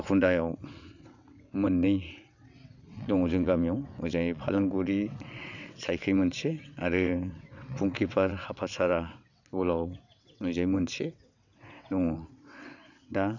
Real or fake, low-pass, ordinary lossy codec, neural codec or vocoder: fake; 7.2 kHz; none; vocoder, 22.05 kHz, 80 mel bands, WaveNeXt